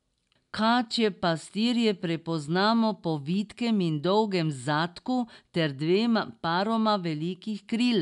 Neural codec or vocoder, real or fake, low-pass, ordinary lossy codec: none; real; 10.8 kHz; MP3, 96 kbps